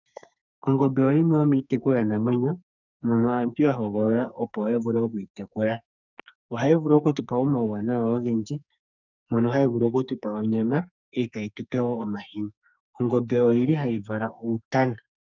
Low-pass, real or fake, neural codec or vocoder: 7.2 kHz; fake; codec, 44.1 kHz, 2.6 kbps, SNAC